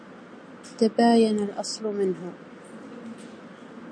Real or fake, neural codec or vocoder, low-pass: real; none; 9.9 kHz